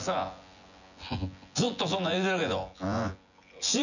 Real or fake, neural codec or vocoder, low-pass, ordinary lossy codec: fake; vocoder, 24 kHz, 100 mel bands, Vocos; 7.2 kHz; none